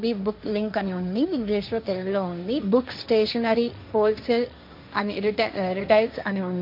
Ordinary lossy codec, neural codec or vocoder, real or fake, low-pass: MP3, 48 kbps; codec, 16 kHz, 1.1 kbps, Voila-Tokenizer; fake; 5.4 kHz